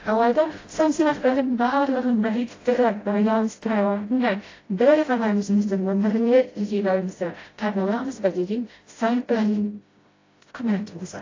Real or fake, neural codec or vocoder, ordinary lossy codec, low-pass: fake; codec, 16 kHz, 0.5 kbps, FreqCodec, smaller model; AAC, 32 kbps; 7.2 kHz